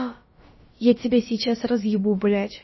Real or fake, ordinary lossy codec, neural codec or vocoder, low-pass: fake; MP3, 24 kbps; codec, 16 kHz, about 1 kbps, DyCAST, with the encoder's durations; 7.2 kHz